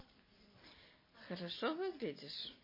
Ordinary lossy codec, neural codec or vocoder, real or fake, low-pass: MP3, 24 kbps; none; real; 5.4 kHz